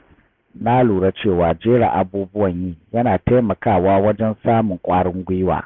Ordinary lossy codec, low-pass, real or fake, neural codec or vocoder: none; none; real; none